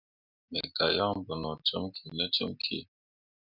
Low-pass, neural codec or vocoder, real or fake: 5.4 kHz; none; real